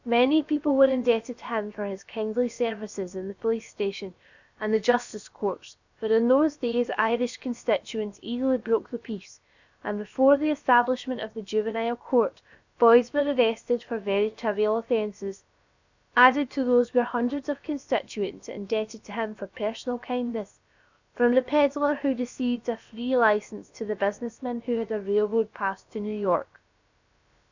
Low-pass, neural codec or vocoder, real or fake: 7.2 kHz; codec, 16 kHz, about 1 kbps, DyCAST, with the encoder's durations; fake